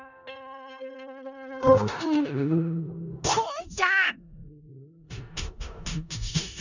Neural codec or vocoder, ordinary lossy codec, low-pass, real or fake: codec, 16 kHz in and 24 kHz out, 0.4 kbps, LongCat-Audio-Codec, four codebook decoder; none; 7.2 kHz; fake